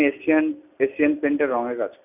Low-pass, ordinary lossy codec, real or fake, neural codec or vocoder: 3.6 kHz; none; real; none